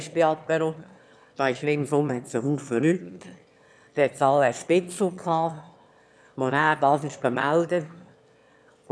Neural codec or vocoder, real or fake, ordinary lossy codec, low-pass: autoencoder, 22.05 kHz, a latent of 192 numbers a frame, VITS, trained on one speaker; fake; none; none